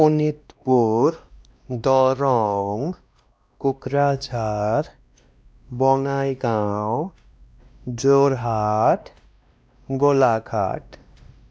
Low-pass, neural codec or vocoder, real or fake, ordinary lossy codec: none; codec, 16 kHz, 1 kbps, X-Codec, WavLM features, trained on Multilingual LibriSpeech; fake; none